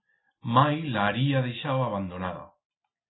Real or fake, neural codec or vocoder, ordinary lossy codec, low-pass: real; none; AAC, 16 kbps; 7.2 kHz